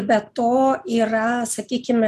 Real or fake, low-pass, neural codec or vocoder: real; 14.4 kHz; none